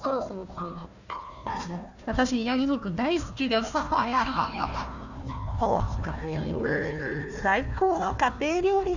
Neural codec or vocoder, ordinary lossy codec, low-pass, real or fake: codec, 16 kHz, 1 kbps, FunCodec, trained on Chinese and English, 50 frames a second; none; 7.2 kHz; fake